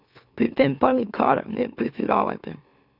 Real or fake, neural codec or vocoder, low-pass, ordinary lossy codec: fake; autoencoder, 44.1 kHz, a latent of 192 numbers a frame, MeloTTS; 5.4 kHz; none